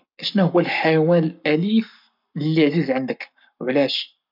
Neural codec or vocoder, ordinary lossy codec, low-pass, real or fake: codec, 44.1 kHz, 7.8 kbps, Pupu-Codec; none; 5.4 kHz; fake